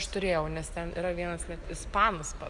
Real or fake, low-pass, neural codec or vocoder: real; 10.8 kHz; none